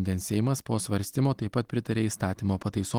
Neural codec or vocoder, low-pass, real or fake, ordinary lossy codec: vocoder, 44.1 kHz, 128 mel bands every 256 samples, BigVGAN v2; 19.8 kHz; fake; Opus, 24 kbps